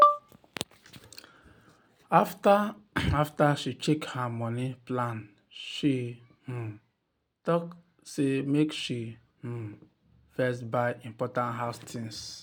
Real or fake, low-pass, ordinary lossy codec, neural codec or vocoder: real; none; none; none